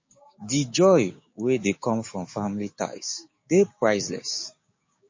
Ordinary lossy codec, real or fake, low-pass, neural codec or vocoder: MP3, 32 kbps; fake; 7.2 kHz; codec, 16 kHz, 6 kbps, DAC